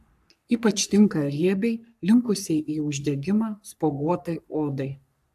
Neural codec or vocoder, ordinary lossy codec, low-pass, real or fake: codec, 44.1 kHz, 3.4 kbps, Pupu-Codec; AAC, 96 kbps; 14.4 kHz; fake